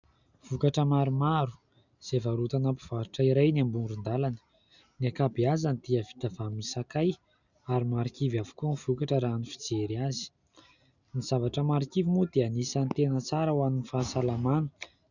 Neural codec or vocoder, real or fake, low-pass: none; real; 7.2 kHz